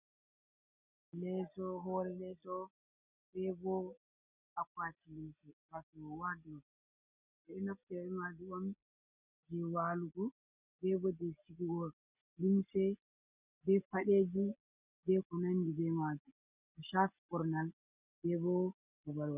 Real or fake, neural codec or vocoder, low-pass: real; none; 3.6 kHz